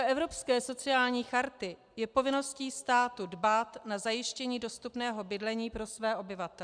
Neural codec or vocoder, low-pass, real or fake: none; 9.9 kHz; real